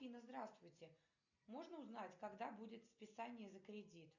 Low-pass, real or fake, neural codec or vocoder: 7.2 kHz; real; none